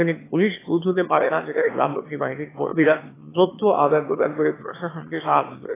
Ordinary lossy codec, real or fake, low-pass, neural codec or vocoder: AAC, 16 kbps; fake; 3.6 kHz; autoencoder, 22.05 kHz, a latent of 192 numbers a frame, VITS, trained on one speaker